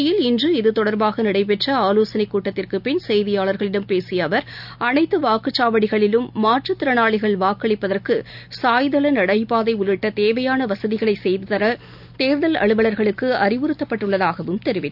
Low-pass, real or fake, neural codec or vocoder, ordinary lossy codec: 5.4 kHz; real; none; none